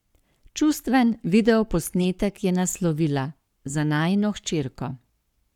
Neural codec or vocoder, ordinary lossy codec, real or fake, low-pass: codec, 44.1 kHz, 7.8 kbps, Pupu-Codec; none; fake; 19.8 kHz